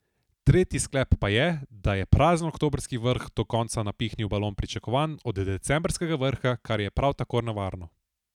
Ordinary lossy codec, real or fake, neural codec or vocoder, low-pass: none; real; none; 19.8 kHz